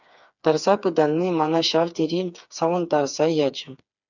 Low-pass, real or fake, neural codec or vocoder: 7.2 kHz; fake; codec, 16 kHz, 4 kbps, FreqCodec, smaller model